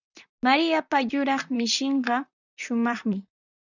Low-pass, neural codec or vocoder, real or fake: 7.2 kHz; vocoder, 44.1 kHz, 128 mel bands, Pupu-Vocoder; fake